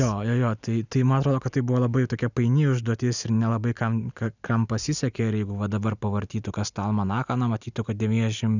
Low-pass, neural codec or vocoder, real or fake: 7.2 kHz; none; real